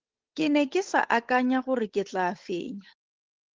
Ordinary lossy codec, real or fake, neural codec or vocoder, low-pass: Opus, 16 kbps; fake; codec, 16 kHz, 8 kbps, FunCodec, trained on Chinese and English, 25 frames a second; 7.2 kHz